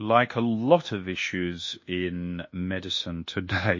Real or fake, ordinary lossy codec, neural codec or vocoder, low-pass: fake; MP3, 32 kbps; codec, 24 kHz, 1.2 kbps, DualCodec; 7.2 kHz